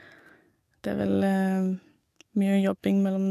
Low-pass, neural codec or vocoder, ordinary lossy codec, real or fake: 14.4 kHz; codec, 44.1 kHz, 7.8 kbps, DAC; MP3, 96 kbps; fake